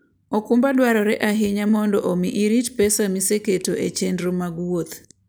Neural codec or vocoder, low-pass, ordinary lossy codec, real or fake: none; none; none; real